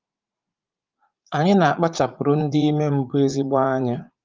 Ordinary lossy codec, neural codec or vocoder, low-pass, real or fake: Opus, 32 kbps; vocoder, 44.1 kHz, 80 mel bands, Vocos; 7.2 kHz; fake